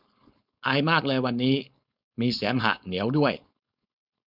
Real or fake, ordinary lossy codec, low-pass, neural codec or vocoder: fake; none; 5.4 kHz; codec, 16 kHz, 4.8 kbps, FACodec